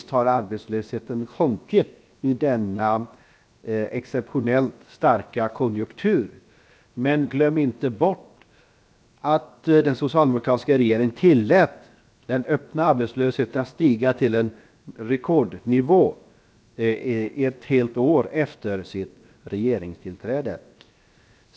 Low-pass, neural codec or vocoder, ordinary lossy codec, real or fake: none; codec, 16 kHz, 0.7 kbps, FocalCodec; none; fake